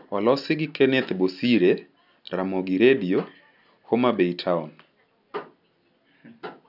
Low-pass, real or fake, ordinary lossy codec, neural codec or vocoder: 5.4 kHz; real; none; none